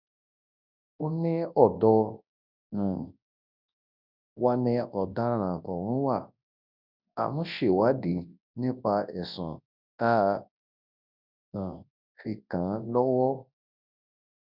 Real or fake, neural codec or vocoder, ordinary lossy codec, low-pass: fake; codec, 24 kHz, 0.9 kbps, WavTokenizer, large speech release; none; 5.4 kHz